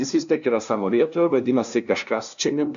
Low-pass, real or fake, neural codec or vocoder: 7.2 kHz; fake; codec, 16 kHz, 0.5 kbps, FunCodec, trained on LibriTTS, 25 frames a second